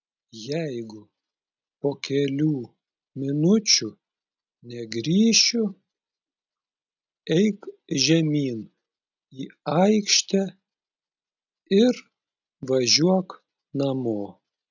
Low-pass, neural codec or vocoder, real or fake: 7.2 kHz; none; real